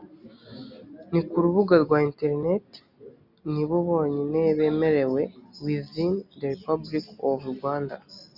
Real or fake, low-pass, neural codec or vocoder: real; 5.4 kHz; none